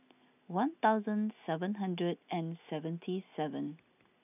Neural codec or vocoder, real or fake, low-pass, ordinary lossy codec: none; real; 3.6 kHz; none